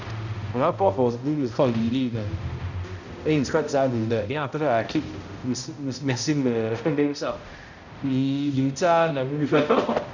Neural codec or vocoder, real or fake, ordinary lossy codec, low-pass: codec, 16 kHz, 0.5 kbps, X-Codec, HuBERT features, trained on balanced general audio; fake; none; 7.2 kHz